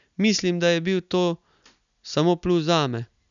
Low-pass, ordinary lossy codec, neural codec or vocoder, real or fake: 7.2 kHz; none; none; real